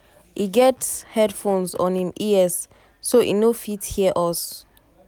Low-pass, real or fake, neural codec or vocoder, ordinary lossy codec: none; real; none; none